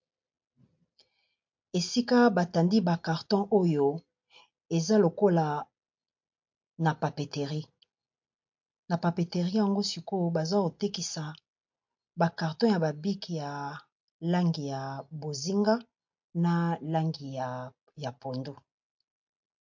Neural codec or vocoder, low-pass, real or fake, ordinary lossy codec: none; 7.2 kHz; real; MP3, 48 kbps